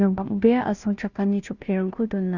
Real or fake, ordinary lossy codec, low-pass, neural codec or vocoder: fake; none; 7.2 kHz; codec, 16 kHz, 0.5 kbps, FunCodec, trained on Chinese and English, 25 frames a second